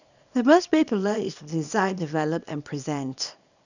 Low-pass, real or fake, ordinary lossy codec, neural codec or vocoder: 7.2 kHz; fake; none; codec, 24 kHz, 0.9 kbps, WavTokenizer, small release